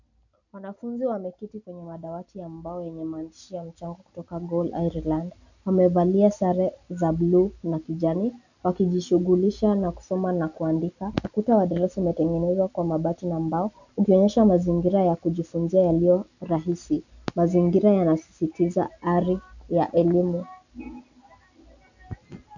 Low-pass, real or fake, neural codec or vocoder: 7.2 kHz; real; none